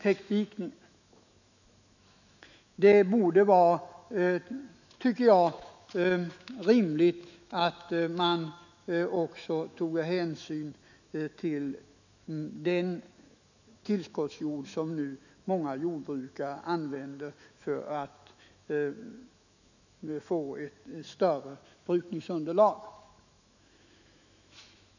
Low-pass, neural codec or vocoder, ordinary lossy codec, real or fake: 7.2 kHz; autoencoder, 48 kHz, 128 numbers a frame, DAC-VAE, trained on Japanese speech; none; fake